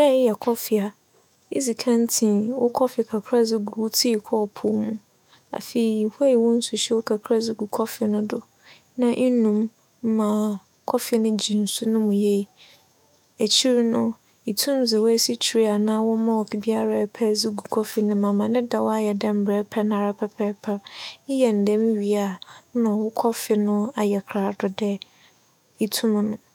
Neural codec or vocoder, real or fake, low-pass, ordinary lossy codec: autoencoder, 48 kHz, 128 numbers a frame, DAC-VAE, trained on Japanese speech; fake; 19.8 kHz; none